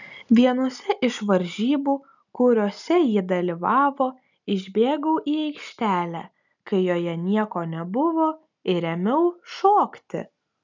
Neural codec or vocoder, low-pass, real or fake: none; 7.2 kHz; real